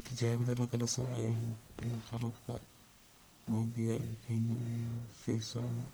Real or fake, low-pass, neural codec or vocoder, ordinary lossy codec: fake; none; codec, 44.1 kHz, 1.7 kbps, Pupu-Codec; none